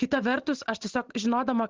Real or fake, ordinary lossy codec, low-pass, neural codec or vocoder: real; Opus, 16 kbps; 7.2 kHz; none